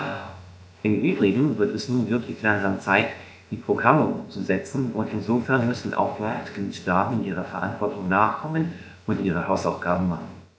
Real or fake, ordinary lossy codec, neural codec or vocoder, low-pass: fake; none; codec, 16 kHz, about 1 kbps, DyCAST, with the encoder's durations; none